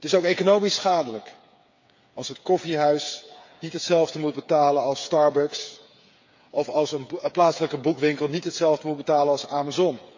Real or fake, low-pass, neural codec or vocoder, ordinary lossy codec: fake; 7.2 kHz; codec, 16 kHz, 8 kbps, FreqCodec, smaller model; MP3, 48 kbps